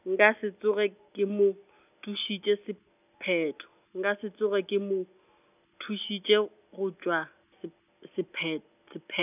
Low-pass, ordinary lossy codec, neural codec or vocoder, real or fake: 3.6 kHz; none; none; real